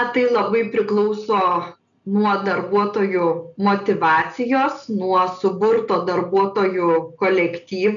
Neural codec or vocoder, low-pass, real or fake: none; 7.2 kHz; real